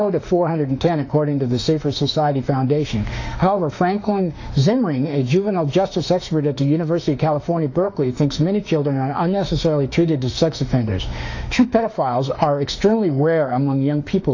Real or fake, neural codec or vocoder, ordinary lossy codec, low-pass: fake; autoencoder, 48 kHz, 32 numbers a frame, DAC-VAE, trained on Japanese speech; AAC, 48 kbps; 7.2 kHz